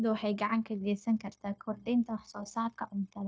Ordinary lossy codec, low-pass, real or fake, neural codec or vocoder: none; none; fake; codec, 16 kHz, 0.9 kbps, LongCat-Audio-Codec